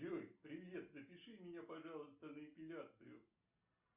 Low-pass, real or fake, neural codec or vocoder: 3.6 kHz; real; none